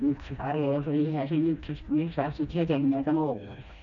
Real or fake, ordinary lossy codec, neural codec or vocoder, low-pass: fake; none; codec, 16 kHz, 1 kbps, FreqCodec, smaller model; 7.2 kHz